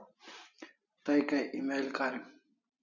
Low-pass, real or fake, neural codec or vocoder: 7.2 kHz; real; none